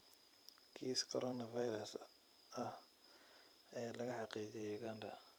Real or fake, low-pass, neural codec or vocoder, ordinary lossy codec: fake; none; vocoder, 44.1 kHz, 128 mel bands every 512 samples, BigVGAN v2; none